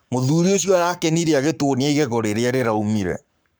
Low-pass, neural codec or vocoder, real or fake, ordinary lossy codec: none; codec, 44.1 kHz, 7.8 kbps, Pupu-Codec; fake; none